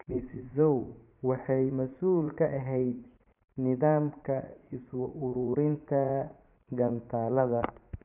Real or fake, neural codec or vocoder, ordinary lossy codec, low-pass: fake; vocoder, 44.1 kHz, 80 mel bands, Vocos; none; 3.6 kHz